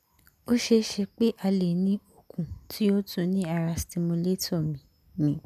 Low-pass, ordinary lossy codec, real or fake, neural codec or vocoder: 14.4 kHz; none; real; none